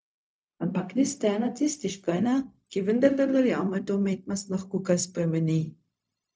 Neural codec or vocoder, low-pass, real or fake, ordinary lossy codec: codec, 16 kHz, 0.4 kbps, LongCat-Audio-Codec; none; fake; none